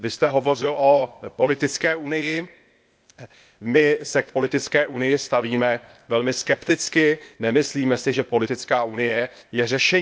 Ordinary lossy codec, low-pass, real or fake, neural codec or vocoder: none; none; fake; codec, 16 kHz, 0.8 kbps, ZipCodec